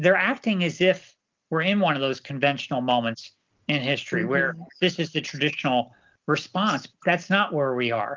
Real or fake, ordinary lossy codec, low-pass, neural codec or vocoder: real; Opus, 32 kbps; 7.2 kHz; none